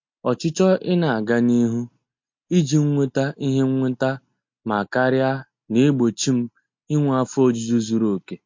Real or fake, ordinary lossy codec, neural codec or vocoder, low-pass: real; MP3, 48 kbps; none; 7.2 kHz